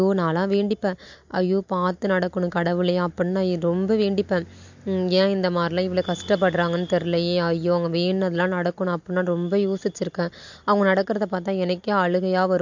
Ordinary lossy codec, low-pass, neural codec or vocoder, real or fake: MP3, 48 kbps; 7.2 kHz; none; real